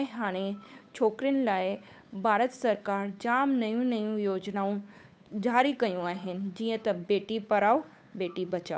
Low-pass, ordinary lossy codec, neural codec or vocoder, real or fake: none; none; codec, 16 kHz, 8 kbps, FunCodec, trained on Chinese and English, 25 frames a second; fake